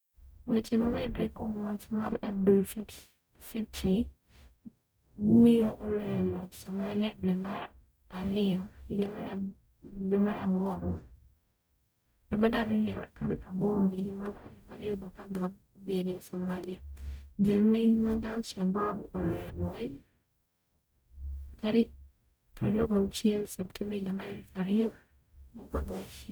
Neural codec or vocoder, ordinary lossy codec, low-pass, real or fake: codec, 44.1 kHz, 0.9 kbps, DAC; none; none; fake